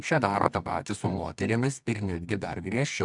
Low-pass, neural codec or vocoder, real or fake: 10.8 kHz; codec, 24 kHz, 0.9 kbps, WavTokenizer, medium music audio release; fake